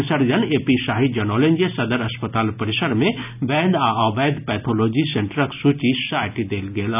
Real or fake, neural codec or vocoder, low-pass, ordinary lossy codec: real; none; 3.6 kHz; none